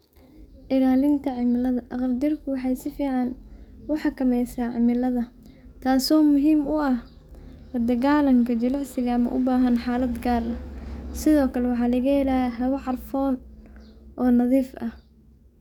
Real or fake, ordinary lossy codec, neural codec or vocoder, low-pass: fake; none; codec, 44.1 kHz, 7.8 kbps, DAC; 19.8 kHz